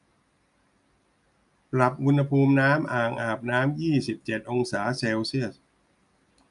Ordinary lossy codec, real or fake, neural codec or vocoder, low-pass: none; real; none; 10.8 kHz